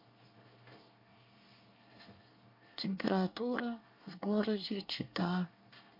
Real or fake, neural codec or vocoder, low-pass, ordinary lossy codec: fake; codec, 24 kHz, 1 kbps, SNAC; 5.4 kHz; MP3, 32 kbps